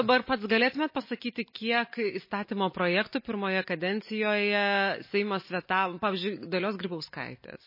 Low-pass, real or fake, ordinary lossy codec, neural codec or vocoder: 5.4 kHz; real; MP3, 24 kbps; none